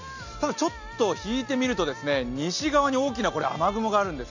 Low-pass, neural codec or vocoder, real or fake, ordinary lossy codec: 7.2 kHz; none; real; none